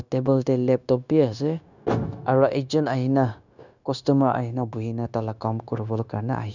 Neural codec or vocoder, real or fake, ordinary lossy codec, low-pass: codec, 16 kHz, 0.9 kbps, LongCat-Audio-Codec; fake; none; 7.2 kHz